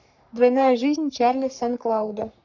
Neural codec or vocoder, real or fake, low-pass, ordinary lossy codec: codec, 44.1 kHz, 3.4 kbps, Pupu-Codec; fake; 7.2 kHz; none